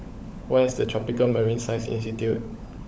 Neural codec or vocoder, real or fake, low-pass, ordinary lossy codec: codec, 16 kHz, 16 kbps, FunCodec, trained on LibriTTS, 50 frames a second; fake; none; none